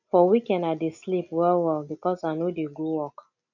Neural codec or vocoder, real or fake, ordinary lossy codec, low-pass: none; real; none; 7.2 kHz